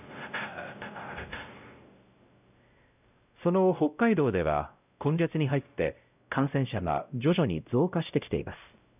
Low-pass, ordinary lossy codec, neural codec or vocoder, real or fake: 3.6 kHz; none; codec, 16 kHz, 0.5 kbps, X-Codec, WavLM features, trained on Multilingual LibriSpeech; fake